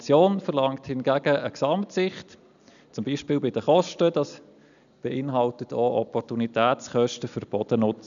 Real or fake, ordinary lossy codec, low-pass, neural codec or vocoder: real; none; 7.2 kHz; none